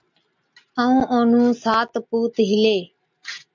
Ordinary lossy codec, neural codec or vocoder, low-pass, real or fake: MP3, 64 kbps; none; 7.2 kHz; real